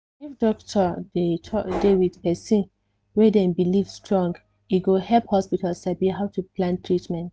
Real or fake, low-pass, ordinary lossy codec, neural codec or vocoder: real; none; none; none